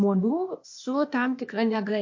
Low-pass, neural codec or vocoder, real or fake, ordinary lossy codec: 7.2 kHz; codec, 16 kHz, 0.8 kbps, ZipCodec; fake; MP3, 48 kbps